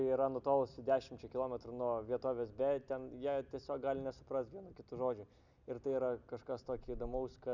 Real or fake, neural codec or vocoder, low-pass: real; none; 7.2 kHz